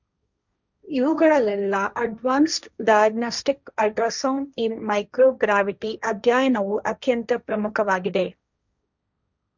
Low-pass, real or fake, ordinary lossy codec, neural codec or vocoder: 7.2 kHz; fake; none; codec, 16 kHz, 1.1 kbps, Voila-Tokenizer